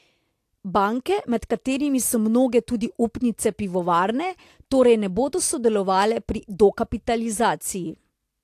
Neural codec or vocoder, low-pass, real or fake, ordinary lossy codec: none; 14.4 kHz; real; AAC, 64 kbps